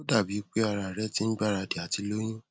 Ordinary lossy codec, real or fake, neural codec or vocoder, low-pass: none; real; none; none